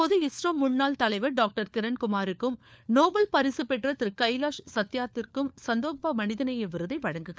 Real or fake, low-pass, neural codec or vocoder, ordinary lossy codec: fake; none; codec, 16 kHz, 4 kbps, FunCodec, trained on LibriTTS, 50 frames a second; none